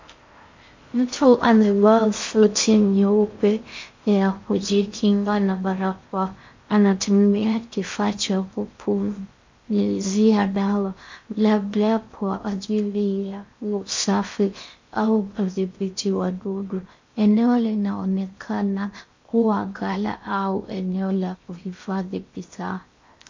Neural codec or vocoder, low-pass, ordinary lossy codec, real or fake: codec, 16 kHz in and 24 kHz out, 0.6 kbps, FocalCodec, streaming, 4096 codes; 7.2 kHz; MP3, 48 kbps; fake